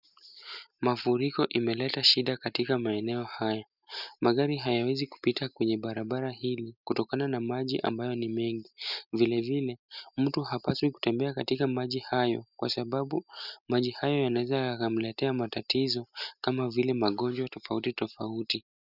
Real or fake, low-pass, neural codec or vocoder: real; 5.4 kHz; none